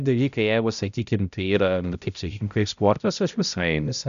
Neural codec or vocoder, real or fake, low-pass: codec, 16 kHz, 0.5 kbps, X-Codec, HuBERT features, trained on balanced general audio; fake; 7.2 kHz